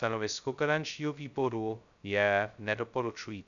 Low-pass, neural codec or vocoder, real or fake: 7.2 kHz; codec, 16 kHz, 0.2 kbps, FocalCodec; fake